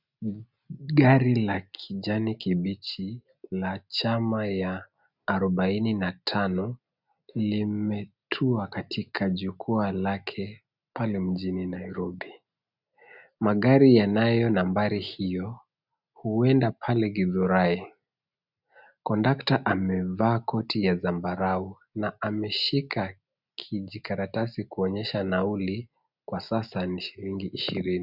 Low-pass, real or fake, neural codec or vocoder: 5.4 kHz; real; none